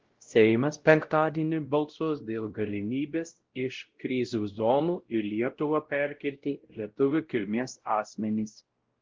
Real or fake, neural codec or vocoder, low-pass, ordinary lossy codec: fake; codec, 16 kHz, 0.5 kbps, X-Codec, WavLM features, trained on Multilingual LibriSpeech; 7.2 kHz; Opus, 16 kbps